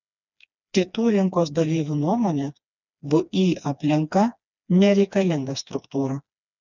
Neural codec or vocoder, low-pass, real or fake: codec, 16 kHz, 2 kbps, FreqCodec, smaller model; 7.2 kHz; fake